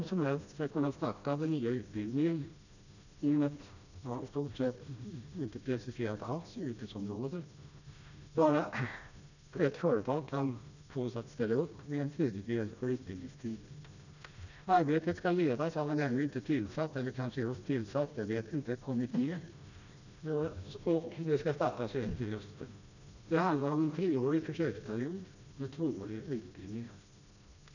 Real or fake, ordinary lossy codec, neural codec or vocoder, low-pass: fake; none; codec, 16 kHz, 1 kbps, FreqCodec, smaller model; 7.2 kHz